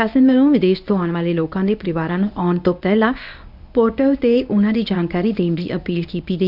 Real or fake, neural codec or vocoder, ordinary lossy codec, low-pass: fake; codec, 24 kHz, 0.9 kbps, WavTokenizer, medium speech release version 1; none; 5.4 kHz